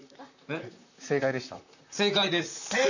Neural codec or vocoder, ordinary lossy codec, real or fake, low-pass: vocoder, 44.1 kHz, 128 mel bands, Pupu-Vocoder; none; fake; 7.2 kHz